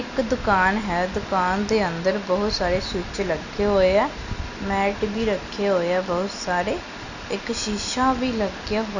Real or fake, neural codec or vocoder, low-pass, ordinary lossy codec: real; none; 7.2 kHz; none